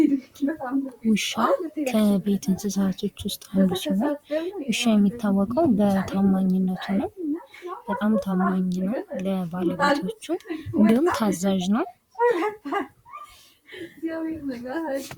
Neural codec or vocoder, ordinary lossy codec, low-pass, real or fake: vocoder, 44.1 kHz, 128 mel bands, Pupu-Vocoder; Opus, 64 kbps; 19.8 kHz; fake